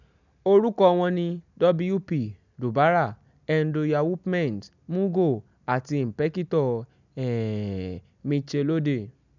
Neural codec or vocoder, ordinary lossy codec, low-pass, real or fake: none; none; 7.2 kHz; real